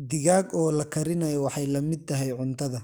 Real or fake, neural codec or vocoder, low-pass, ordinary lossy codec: fake; codec, 44.1 kHz, 7.8 kbps, DAC; none; none